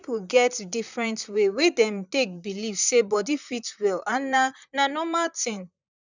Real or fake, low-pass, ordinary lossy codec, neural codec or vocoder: fake; 7.2 kHz; none; vocoder, 44.1 kHz, 128 mel bands, Pupu-Vocoder